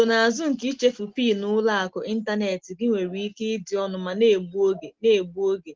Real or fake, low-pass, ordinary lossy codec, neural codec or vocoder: real; 7.2 kHz; Opus, 16 kbps; none